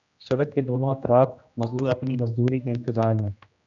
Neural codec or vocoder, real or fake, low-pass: codec, 16 kHz, 1 kbps, X-Codec, HuBERT features, trained on general audio; fake; 7.2 kHz